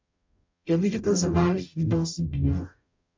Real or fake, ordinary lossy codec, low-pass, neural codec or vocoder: fake; MP3, 64 kbps; 7.2 kHz; codec, 44.1 kHz, 0.9 kbps, DAC